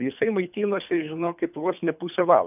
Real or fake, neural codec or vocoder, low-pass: fake; codec, 24 kHz, 3 kbps, HILCodec; 3.6 kHz